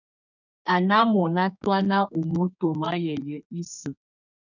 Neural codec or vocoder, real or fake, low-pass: codec, 32 kHz, 1.9 kbps, SNAC; fake; 7.2 kHz